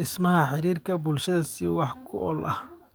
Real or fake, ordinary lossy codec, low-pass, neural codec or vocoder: fake; none; none; codec, 44.1 kHz, 7.8 kbps, DAC